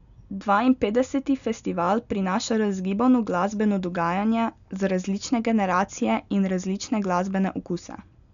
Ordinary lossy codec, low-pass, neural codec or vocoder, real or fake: none; 7.2 kHz; none; real